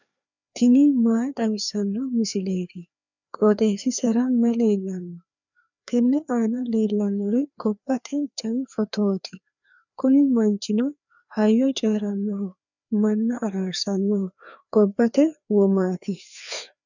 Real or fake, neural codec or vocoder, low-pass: fake; codec, 16 kHz, 2 kbps, FreqCodec, larger model; 7.2 kHz